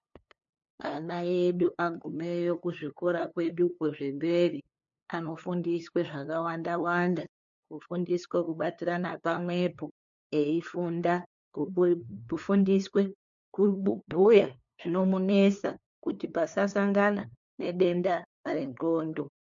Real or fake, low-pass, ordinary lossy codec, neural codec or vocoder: fake; 7.2 kHz; MP3, 64 kbps; codec, 16 kHz, 2 kbps, FunCodec, trained on LibriTTS, 25 frames a second